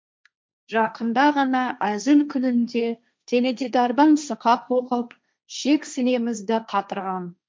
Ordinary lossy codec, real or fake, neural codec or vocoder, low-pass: none; fake; codec, 16 kHz, 1.1 kbps, Voila-Tokenizer; none